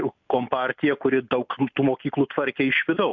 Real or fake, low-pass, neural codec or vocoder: real; 7.2 kHz; none